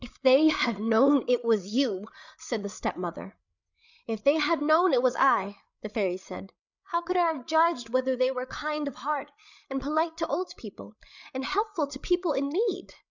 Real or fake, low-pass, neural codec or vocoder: fake; 7.2 kHz; codec, 16 kHz, 8 kbps, FreqCodec, larger model